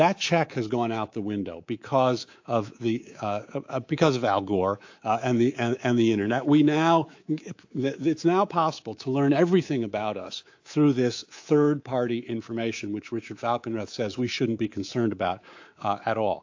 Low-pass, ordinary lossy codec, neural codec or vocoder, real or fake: 7.2 kHz; AAC, 48 kbps; codec, 24 kHz, 3.1 kbps, DualCodec; fake